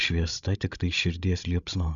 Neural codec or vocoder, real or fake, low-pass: codec, 16 kHz, 16 kbps, FreqCodec, larger model; fake; 7.2 kHz